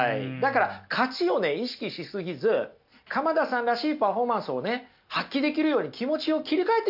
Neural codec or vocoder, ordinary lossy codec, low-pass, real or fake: none; AAC, 48 kbps; 5.4 kHz; real